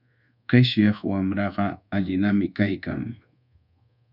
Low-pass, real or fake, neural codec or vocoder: 5.4 kHz; fake; codec, 24 kHz, 1.2 kbps, DualCodec